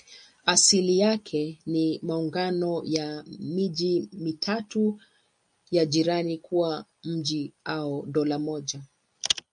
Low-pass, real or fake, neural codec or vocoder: 9.9 kHz; real; none